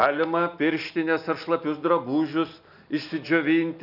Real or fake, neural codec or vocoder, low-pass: real; none; 5.4 kHz